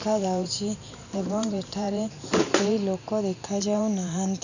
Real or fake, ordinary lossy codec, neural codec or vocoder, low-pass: fake; none; vocoder, 44.1 kHz, 128 mel bands every 512 samples, BigVGAN v2; 7.2 kHz